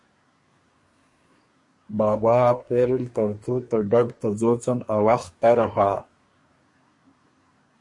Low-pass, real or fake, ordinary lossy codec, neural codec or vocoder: 10.8 kHz; fake; MP3, 48 kbps; codec, 24 kHz, 1 kbps, SNAC